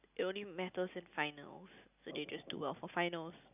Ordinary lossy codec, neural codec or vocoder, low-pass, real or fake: none; none; 3.6 kHz; real